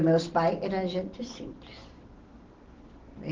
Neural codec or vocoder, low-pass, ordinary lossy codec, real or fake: none; 7.2 kHz; Opus, 16 kbps; real